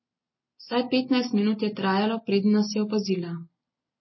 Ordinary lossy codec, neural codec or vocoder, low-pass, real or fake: MP3, 24 kbps; none; 7.2 kHz; real